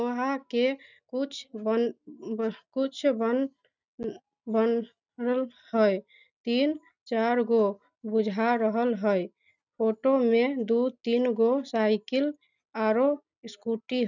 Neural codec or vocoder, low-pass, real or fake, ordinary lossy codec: none; 7.2 kHz; real; none